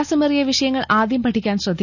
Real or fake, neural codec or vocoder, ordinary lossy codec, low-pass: real; none; none; 7.2 kHz